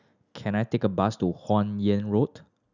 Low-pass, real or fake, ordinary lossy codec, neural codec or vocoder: 7.2 kHz; real; none; none